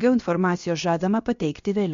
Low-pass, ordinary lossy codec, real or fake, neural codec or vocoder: 7.2 kHz; MP3, 48 kbps; fake; codec, 16 kHz, about 1 kbps, DyCAST, with the encoder's durations